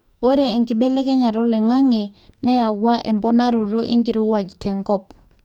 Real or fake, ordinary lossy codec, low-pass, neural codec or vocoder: fake; none; 19.8 kHz; codec, 44.1 kHz, 2.6 kbps, DAC